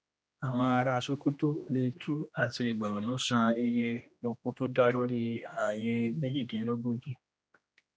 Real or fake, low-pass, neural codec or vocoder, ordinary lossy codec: fake; none; codec, 16 kHz, 1 kbps, X-Codec, HuBERT features, trained on general audio; none